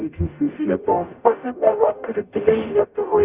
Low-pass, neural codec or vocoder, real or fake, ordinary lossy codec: 3.6 kHz; codec, 44.1 kHz, 0.9 kbps, DAC; fake; Opus, 64 kbps